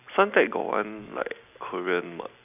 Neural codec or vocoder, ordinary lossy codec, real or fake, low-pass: none; none; real; 3.6 kHz